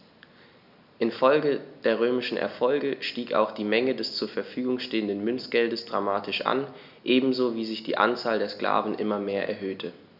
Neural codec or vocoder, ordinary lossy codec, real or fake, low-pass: none; none; real; 5.4 kHz